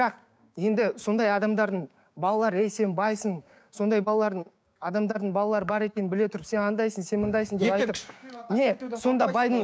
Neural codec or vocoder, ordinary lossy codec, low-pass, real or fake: codec, 16 kHz, 6 kbps, DAC; none; none; fake